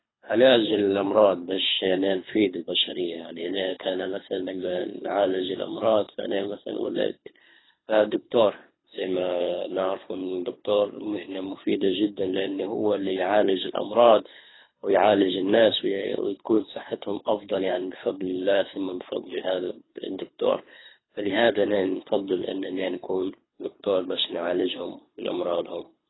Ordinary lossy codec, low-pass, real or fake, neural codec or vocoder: AAC, 16 kbps; 7.2 kHz; fake; codec, 24 kHz, 3 kbps, HILCodec